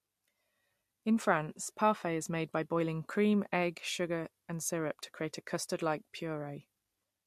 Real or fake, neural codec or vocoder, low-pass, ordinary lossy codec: real; none; 14.4 kHz; MP3, 64 kbps